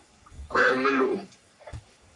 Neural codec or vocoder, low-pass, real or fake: codec, 44.1 kHz, 3.4 kbps, Pupu-Codec; 10.8 kHz; fake